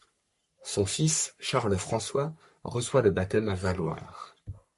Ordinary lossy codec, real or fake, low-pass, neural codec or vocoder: MP3, 48 kbps; fake; 14.4 kHz; codec, 44.1 kHz, 3.4 kbps, Pupu-Codec